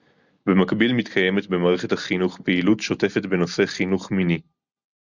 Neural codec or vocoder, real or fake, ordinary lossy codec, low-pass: none; real; Opus, 64 kbps; 7.2 kHz